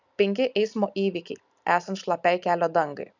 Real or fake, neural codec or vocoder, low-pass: real; none; 7.2 kHz